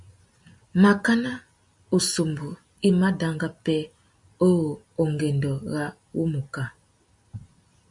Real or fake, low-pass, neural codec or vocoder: real; 10.8 kHz; none